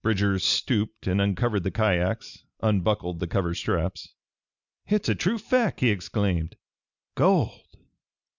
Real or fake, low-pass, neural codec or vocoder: real; 7.2 kHz; none